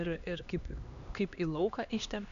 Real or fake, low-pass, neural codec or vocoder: fake; 7.2 kHz; codec, 16 kHz, 2 kbps, X-Codec, HuBERT features, trained on LibriSpeech